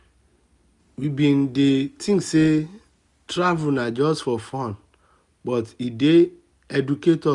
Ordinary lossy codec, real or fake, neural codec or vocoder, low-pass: none; real; none; 10.8 kHz